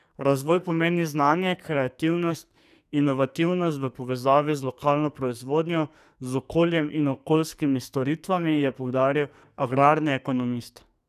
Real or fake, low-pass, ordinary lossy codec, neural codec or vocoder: fake; 14.4 kHz; none; codec, 44.1 kHz, 2.6 kbps, SNAC